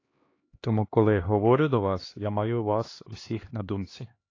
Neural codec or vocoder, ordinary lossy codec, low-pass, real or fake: codec, 16 kHz, 2 kbps, X-Codec, HuBERT features, trained on LibriSpeech; AAC, 32 kbps; 7.2 kHz; fake